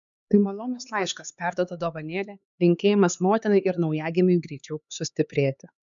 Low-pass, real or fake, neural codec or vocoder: 7.2 kHz; fake; codec, 16 kHz, 4 kbps, X-Codec, HuBERT features, trained on LibriSpeech